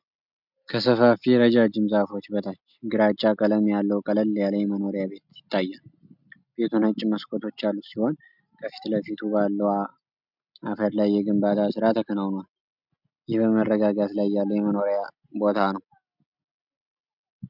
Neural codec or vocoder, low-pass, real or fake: none; 5.4 kHz; real